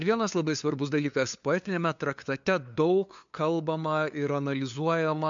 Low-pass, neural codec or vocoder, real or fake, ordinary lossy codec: 7.2 kHz; codec, 16 kHz, 2 kbps, FunCodec, trained on LibriTTS, 25 frames a second; fake; MP3, 64 kbps